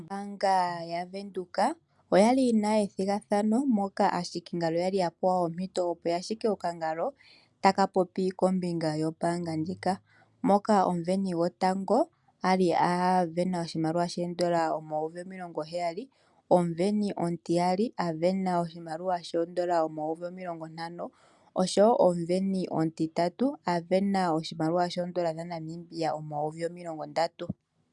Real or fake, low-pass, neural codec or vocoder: real; 10.8 kHz; none